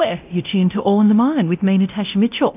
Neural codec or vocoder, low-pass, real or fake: codec, 24 kHz, 0.9 kbps, DualCodec; 3.6 kHz; fake